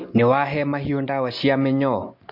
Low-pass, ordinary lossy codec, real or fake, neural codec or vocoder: 5.4 kHz; MP3, 32 kbps; real; none